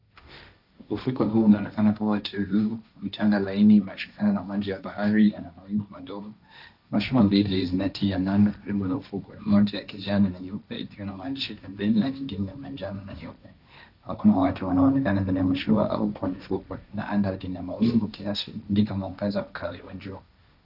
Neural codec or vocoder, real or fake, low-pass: codec, 16 kHz, 1.1 kbps, Voila-Tokenizer; fake; 5.4 kHz